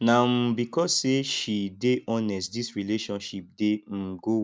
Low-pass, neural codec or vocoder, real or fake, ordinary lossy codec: none; none; real; none